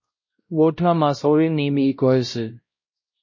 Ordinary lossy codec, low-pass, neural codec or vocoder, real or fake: MP3, 32 kbps; 7.2 kHz; codec, 16 kHz, 0.5 kbps, X-Codec, WavLM features, trained on Multilingual LibriSpeech; fake